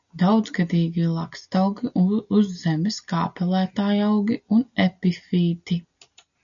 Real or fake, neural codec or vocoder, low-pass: real; none; 7.2 kHz